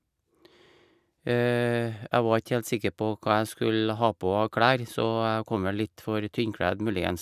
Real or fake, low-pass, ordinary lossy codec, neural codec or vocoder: real; 14.4 kHz; none; none